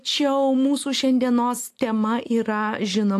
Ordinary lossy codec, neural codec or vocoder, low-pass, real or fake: AAC, 64 kbps; none; 14.4 kHz; real